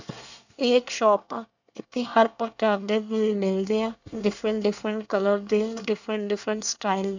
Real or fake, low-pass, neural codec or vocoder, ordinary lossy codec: fake; 7.2 kHz; codec, 24 kHz, 1 kbps, SNAC; none